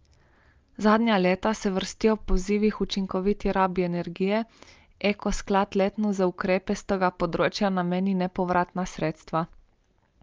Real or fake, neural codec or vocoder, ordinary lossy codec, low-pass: real; none; Opus, 24 kbps; 7.2 kHz